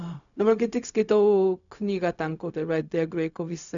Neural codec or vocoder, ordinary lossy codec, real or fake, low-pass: codec, 16 kHz, 0.4 kbps, LongCat-Audio-Codec; MP3, 96 kbps; fake; 7.2 kHz